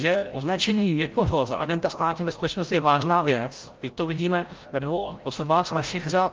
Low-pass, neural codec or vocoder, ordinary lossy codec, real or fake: 7.2 kHz; codec, 16 kHz, 0.5 kbps, FreqCodec, larger model; Opus, 32 kbps; fake